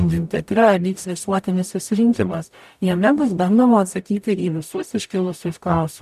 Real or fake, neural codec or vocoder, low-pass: fake; codec, 44.1 kHz, 0.9 kbps, DAC; 14.4 kHz